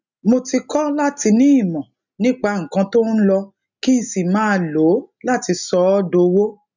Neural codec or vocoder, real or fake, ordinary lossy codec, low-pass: none; real; none; 7.2 kHz